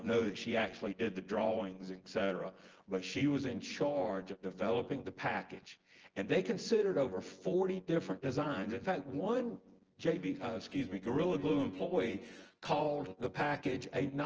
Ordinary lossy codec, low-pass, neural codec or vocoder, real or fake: Opus, 16 kbps; 7.2 kHz; vocoder, 24 kHz, 100 mel bands, Vocos; fake